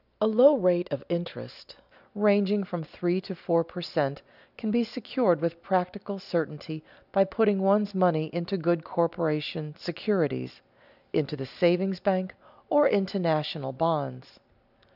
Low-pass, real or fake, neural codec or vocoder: 5.4 kHz; real; none